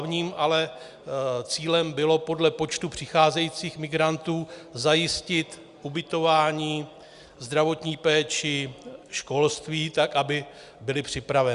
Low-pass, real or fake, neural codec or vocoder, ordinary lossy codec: 10.8 kHz; real; none; Opus, 64 kbps